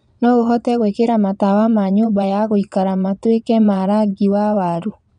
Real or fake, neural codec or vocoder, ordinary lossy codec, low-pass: fake; vocoder, 22.05 kHz, 80 mel bands, Vocos; none; 9.9 kHz